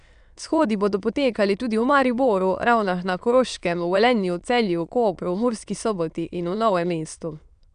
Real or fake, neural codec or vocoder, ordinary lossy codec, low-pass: fake; autoencoder, 22.05 kHz, a latent of 192 numbers a frame, VITS, trained on many speakers; none; 9.9 kHz